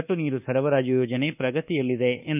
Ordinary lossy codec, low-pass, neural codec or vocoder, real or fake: none; 3.6 kHz; codec, 24 kHz, 1.2 kbps, DualCodec; fake